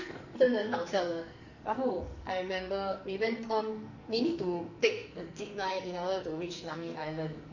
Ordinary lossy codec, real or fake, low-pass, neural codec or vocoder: none; fake; 7.2 kHz; codec, 44.1 kHz, 2.6 kbps, SNAC